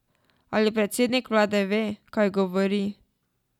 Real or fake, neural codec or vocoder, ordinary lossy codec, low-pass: real; none; none; 19.8 kHz